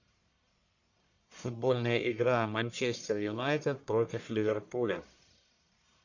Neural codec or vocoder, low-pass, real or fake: codec, 44.1 kHz, 1.7 kbps, Pupu-Codec; 7.2 kHz; fake